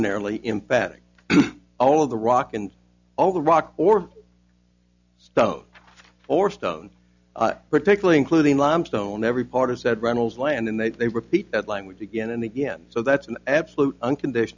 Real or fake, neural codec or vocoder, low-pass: real; none; 7.2 kHz